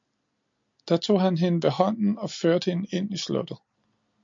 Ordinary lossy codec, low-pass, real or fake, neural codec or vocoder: MP3, 48 kbps; 7.2 kHz; real; none